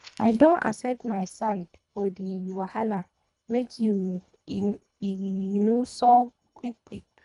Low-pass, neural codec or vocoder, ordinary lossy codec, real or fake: 10.8 kHz; codec, 24 kHz, 1.5 kbps, HILCodec; none; fake